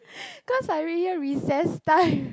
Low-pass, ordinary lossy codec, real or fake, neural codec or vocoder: none; none; real; none